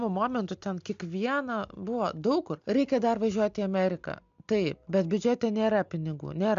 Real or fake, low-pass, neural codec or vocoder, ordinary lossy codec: real; 7.2 kHz; none; MP3, 64 kbps